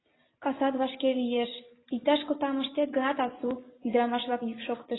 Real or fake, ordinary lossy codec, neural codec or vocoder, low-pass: real; AAC, 16 kbps; none; 7.2 kHz